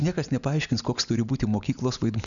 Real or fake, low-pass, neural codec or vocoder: real; 7.2 kHz; none